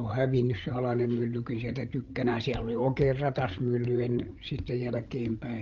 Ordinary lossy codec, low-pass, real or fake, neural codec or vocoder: Opus, 32 kbps; 7.2 kHz; fake; codec, 16 kHz, 8 kbps, FreqCodec, larger model